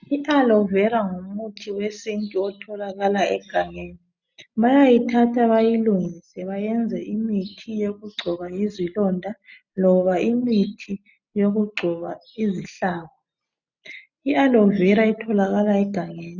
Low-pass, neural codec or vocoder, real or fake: 7.2 kHz; none; real